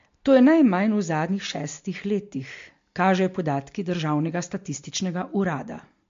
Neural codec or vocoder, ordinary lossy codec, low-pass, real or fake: none; MP3, 48 kbps; 7.2 kHz; real